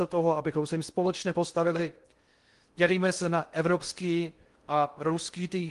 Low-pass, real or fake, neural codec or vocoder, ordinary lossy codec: 10.8 kHz; fake; codec, 16 kHz in and 24 kHz out, 0.6 kbps, FocalCodec, streaming, 2048 codes; Opus, 24 kbps